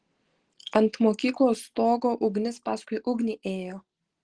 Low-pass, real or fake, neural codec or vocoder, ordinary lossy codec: 9.9 kHz; real; none; Opus, 16 kbps